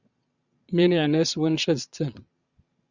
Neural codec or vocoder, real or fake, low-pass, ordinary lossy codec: vocoder, 22.05 kHz, 80 mel bands, Vocos; fake; 7.2 kHz; Opus, 64 kbps